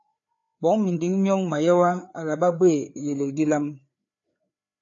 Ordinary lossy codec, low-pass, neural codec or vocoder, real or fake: AAC, 48 kbps; 7.2 kHz; codec, 16 kHz, 4 kbps, FreqCodec, larger model; fake